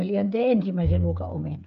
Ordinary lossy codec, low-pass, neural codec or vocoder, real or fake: none; 7.2 kHz; codec, 16 kHz, 8 kbps, FreqCodec, smaller model; fake